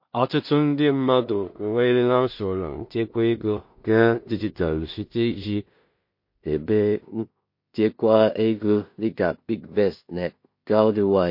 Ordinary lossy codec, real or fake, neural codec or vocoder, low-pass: MP3, 32 kbps; fake; codec, 16 kHz in and 24 kHz out, 0.4 kbps, LongCat-Audio-Codec, two codebook decoder; 5.4 kHz